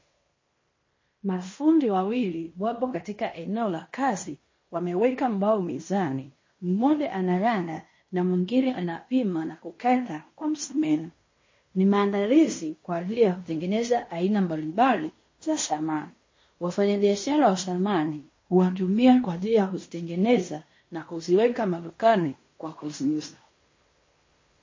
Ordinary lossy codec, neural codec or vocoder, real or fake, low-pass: MP3, 32 kbps; codec, 16 kHz in and 24 kHz out, 0.9 kbps, LongCat-Audio-Codec, fine tuned four codebook decoder; fake; 7.2 kHz